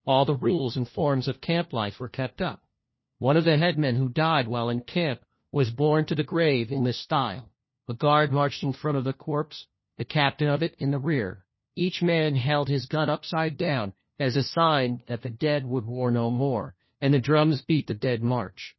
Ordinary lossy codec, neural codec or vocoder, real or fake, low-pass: MP3, 24 kbps; codec, 16 kHz, 1 kbps, FunCodec, trained on LibriTTS, 50 frames a second; fake; 7.2 kHz